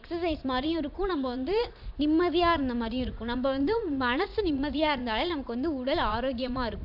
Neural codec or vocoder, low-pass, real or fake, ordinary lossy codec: vocoder, 44.1 kHz, 80 mel bands, Vocos; 5.4 kHz; fake; AAC, 48 kbps